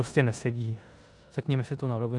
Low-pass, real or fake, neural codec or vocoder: 10.8 kHz; fake; codec, 16 kHz in and 24 kHz out, 0.9 kbps, LongCat-Audio-Codec, four codebook decoder